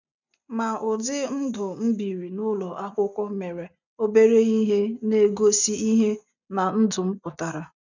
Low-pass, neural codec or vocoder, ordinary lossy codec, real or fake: 7.2 kHz; none; none; real